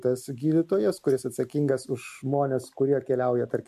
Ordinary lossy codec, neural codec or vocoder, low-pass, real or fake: MP3, 64 kbps; none; 14.4 kHz; real